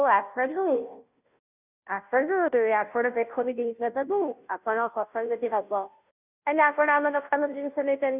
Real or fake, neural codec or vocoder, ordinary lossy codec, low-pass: fake; codec, 16 kHz, 0.5 kbps, FunCodec, trained on Chinese and English, 25 frames a second; none; 3.6 kHz